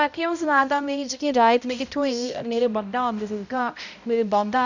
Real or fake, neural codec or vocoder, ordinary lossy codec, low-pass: fake; codec, 16 kHz, 0.5 kbps, X-Codec, HuBERT features, trained on balanced general audio; none; 7.2 kHz